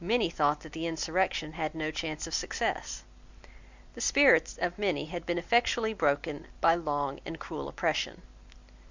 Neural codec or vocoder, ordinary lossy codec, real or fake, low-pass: none; Opus, 64 kbps; real; 7.2 kHz